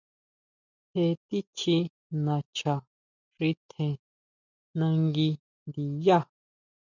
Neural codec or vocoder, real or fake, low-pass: none; real; 7.2 kHz